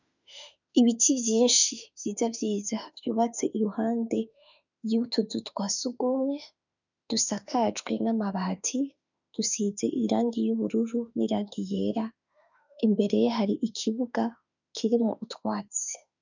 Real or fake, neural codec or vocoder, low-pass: fake; autoencoder, 48 kHz, 32 numbers a frame, DAC-VAE, trained on Japanese speech; 7.2 kHz